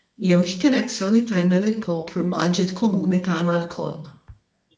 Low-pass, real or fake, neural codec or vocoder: 10.8 kHz; fake; codec, 24 kHz, 0.9 kbps, WavTokenizer, medium music audio release